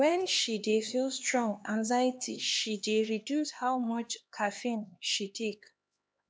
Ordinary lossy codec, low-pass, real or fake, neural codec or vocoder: none; none; fake; codec, 16 kHz, 2 kbps, X-Codec, HuBERT features, trained on LibriSpeech